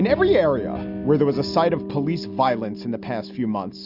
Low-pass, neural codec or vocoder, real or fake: 5.4 kHz; none; real